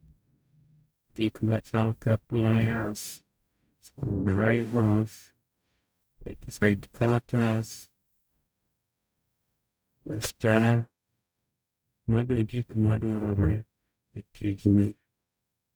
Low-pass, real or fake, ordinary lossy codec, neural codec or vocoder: none; fake; none; codec, 44.1 kHz, 0.9 kbps, DAC